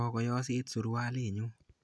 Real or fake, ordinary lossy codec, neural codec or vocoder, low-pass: real; none; none; none